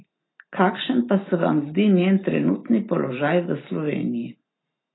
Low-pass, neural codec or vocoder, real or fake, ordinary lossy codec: 7.2 kHz; none; real; AAC, 16 kbps